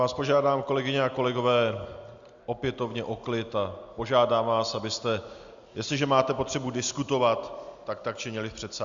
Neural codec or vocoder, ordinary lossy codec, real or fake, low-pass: none; Opus, 64 kbps; real; 7.2 kHz